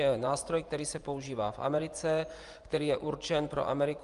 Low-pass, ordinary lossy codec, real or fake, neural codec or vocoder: 10.8 kHz; Opus, 32 kbps; fake; vocoder, 24 kHz, 100 mel bands, Vocos